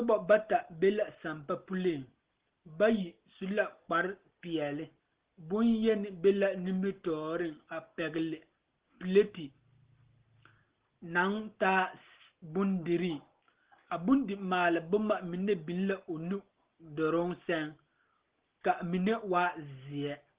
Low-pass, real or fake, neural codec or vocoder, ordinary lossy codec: 3.6 kHz; real; none; Opus, 16 kbps